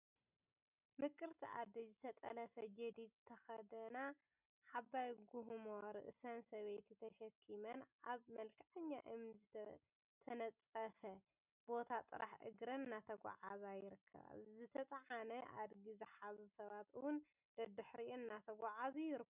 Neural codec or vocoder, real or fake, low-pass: none; real; 3.6 kHz